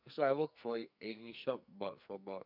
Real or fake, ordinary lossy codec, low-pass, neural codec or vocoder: fake; none; 5.4 kHz; codec, 44.1 kHz, 2.6 kbps, SNAC